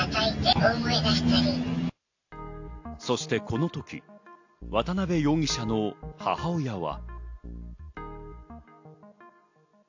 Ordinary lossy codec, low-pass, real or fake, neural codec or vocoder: AAC, 48 kbps; 7.2 kHz; real; none